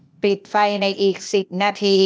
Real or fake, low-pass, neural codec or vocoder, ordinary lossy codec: fake; none; codec, 16 kHz, 0.8 kbps, ZipCodec; none